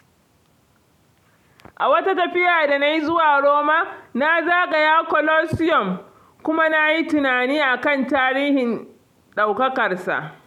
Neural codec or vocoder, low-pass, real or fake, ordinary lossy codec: none; 19.8 kHz; real; none